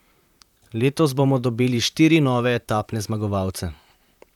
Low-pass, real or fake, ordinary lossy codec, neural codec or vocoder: 19.8 kHz; fake; none; vocoder, 44.1 kHz, 128 mel bands, Pupu-Vocoder